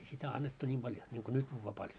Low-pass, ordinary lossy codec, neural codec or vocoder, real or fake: 9.9 kHz; none; none; real